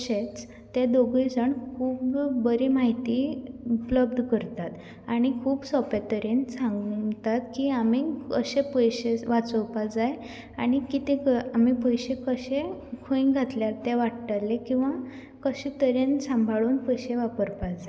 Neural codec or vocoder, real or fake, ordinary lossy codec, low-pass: none; real; none; none